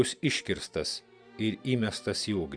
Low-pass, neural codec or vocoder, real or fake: 9.9 kHz; none; real